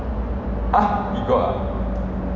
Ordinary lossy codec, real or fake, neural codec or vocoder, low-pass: none; real; none; 7.2 kHz